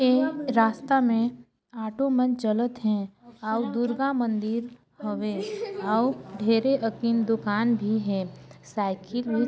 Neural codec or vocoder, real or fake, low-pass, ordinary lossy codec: none; real; none; none